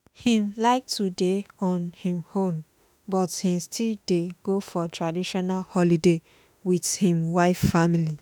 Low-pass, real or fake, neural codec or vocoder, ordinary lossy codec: none; fake; autoencoder, 48 kHz, 32 numbers a frame, DAC-VAE, trained on Japanese speech; none